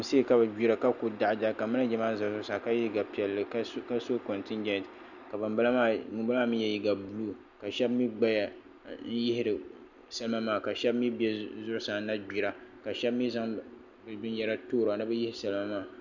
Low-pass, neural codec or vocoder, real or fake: 7.2 kHz; none; real